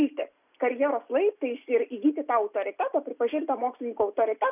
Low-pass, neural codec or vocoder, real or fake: 3.6 kHz; none; real